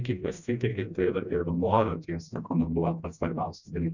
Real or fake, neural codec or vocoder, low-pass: fake; codec, 16 kHz, 1 kbps, FreqCodec, smaller model; 7.2 kHz